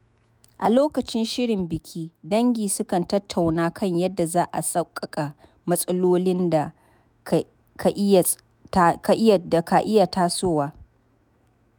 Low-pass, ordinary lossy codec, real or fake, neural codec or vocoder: none; none; fake; autoencoder, 48 kHz, 128 numbers a frame, DAC-VAE, trained on Japanese speech